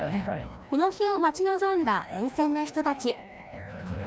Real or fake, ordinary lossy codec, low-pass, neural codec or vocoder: fake; none; none; codec, 16 kHz, 1 kbps, FreqCodec, larger model